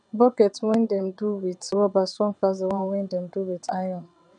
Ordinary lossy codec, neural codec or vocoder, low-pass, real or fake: none; vocoder, 22.05 kHz, 80 mel bands, WaveNeXt; 9.9 kHz; fake